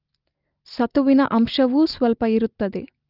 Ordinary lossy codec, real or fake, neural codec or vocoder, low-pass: Opus, 24 kbps; real; none; 5.4 kHz